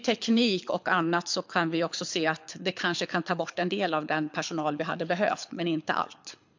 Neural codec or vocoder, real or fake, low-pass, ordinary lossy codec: codec, 24 kHz, 6 kbps, HILCodec; fake; 7.2 kHz; MP3, 64 kbps